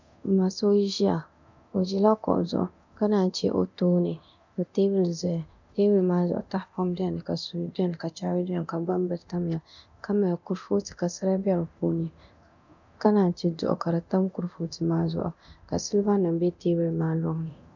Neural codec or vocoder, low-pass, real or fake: codec, 24 kHz, 0.9 kbps, DualCodec; 7.2 kHz; fake